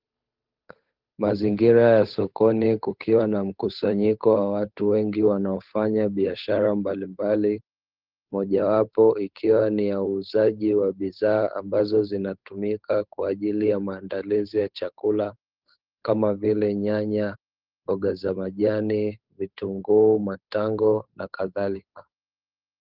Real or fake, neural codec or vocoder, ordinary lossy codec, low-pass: fake; codec, 16 kHz, 8 kbps, FunCodec, trained on Chinese and English, 25 frames a second; Opus, 32 kbps; 5.4 kHz